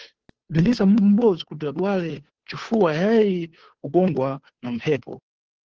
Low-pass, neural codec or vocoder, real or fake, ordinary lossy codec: 7.2 kHz; codec, 16 kHz, 2 kbps, FunCodec, trained on Chinese and English, 25 frames a second; fake; Opus, 16 kbps